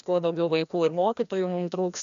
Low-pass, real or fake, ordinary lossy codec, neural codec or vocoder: 7.2 kHz; fake; AAC, 96 kbps; codec, 16 kHz, 1 kbps, FreqCodec, larger model